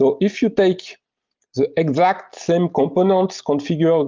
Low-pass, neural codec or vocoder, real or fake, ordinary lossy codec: 7.2 kHz; none; real; Opus, 32 kbps